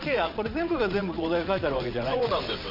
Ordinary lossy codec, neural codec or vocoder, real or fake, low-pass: none; vocoder, 22.05 kHz, 80 mel bands, WaveNeXt; fake; 5.4 kHz